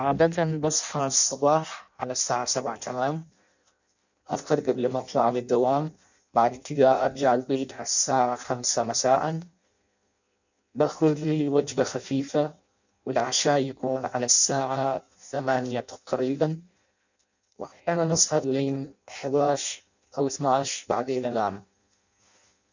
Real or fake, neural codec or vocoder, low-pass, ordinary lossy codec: fake; codec, 16 kHz in and 24 kHz out, 0.6 kbps, FireRedTTS-2 codec; 7.2 kHz; none